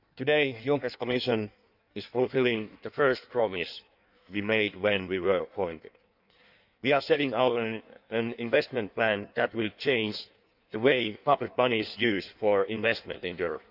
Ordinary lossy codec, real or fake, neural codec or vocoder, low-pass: none; fake; codec, 16 kHz in and 24 kHz out, 1.1 kbps, FireRedTTS-2 codec; 5.4 kHz